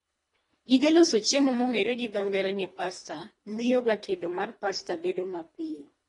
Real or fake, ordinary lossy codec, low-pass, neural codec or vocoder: fake; AAC, 32 kbps; 10.8 kHz; codec, 24 kHz, 1.5 kbps, HILCodec